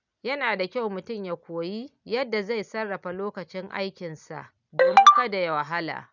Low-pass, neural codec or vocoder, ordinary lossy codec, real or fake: 7.2 kHz; none; none; real